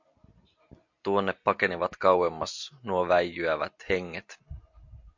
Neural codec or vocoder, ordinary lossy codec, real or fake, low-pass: none; MP3, 48 kbps; real; 7.2 kHz